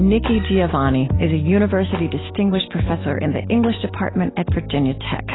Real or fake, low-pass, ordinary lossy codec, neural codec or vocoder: real; 7.2 kHz; AAC, 16 kbps; none